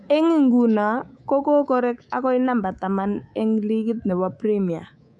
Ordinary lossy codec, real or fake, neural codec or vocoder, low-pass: none; fake; codec, 24 kHz, 3.1 kbps, DualCodec; 10.8 kHz